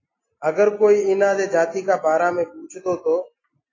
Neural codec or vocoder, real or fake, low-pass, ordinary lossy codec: none; real; 7.2 kHz; AAC, 32 kbps